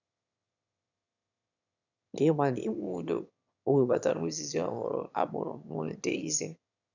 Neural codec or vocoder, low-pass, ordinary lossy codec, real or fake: autoencoder, 22.05 kHz, a latent of 192 numbers a frame, VITS, trained on one speaker; 7.2 kHz; none; fake